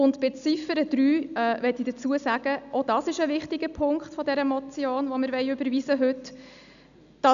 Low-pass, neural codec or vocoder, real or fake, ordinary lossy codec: 7.2 kHz; none; real; none